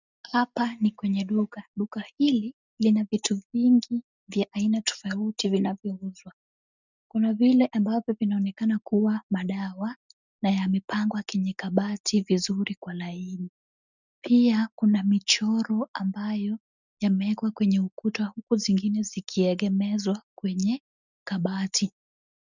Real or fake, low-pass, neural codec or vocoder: real; 7.2 kHz; none